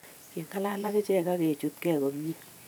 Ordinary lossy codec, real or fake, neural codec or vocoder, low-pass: none; fake; vocoder, 44.1 kHz, 128 mel bands every 512 samples, BigVGAN v2; none